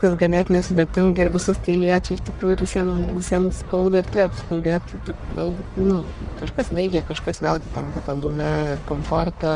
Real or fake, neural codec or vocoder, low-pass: fake; codec, 44.1 kHz, 1.7 kbps, Pupu-Codec; 10.8 kHz